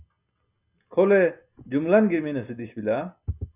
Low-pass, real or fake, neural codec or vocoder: 3.6 kHz; real; none